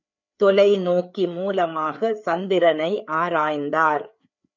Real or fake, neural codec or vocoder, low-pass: fake; codec, 16 kHz, 4 kbps, FreqCodec, larger model; 7.2 kHz